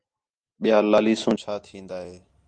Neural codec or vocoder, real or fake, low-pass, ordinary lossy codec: none; real; 9.9 kHz; Opus, 24 kbps